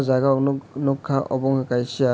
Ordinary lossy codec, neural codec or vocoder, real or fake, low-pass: none; none; real; none